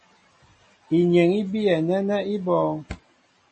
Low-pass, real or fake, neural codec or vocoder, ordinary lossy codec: 10.8 kHz; real; none; MP3, 32 kbps